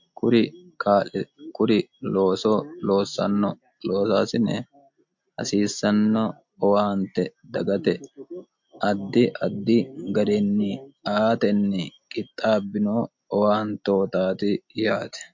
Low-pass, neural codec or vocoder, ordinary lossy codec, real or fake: 7.2 kHz; none; MP3, 48 kbps; real